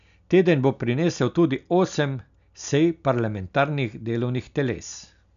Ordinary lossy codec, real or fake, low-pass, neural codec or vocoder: none; real; 7.2 kHz; none